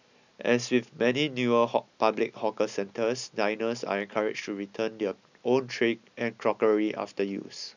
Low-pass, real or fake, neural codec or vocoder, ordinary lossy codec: 7.2 kHz; real; none; none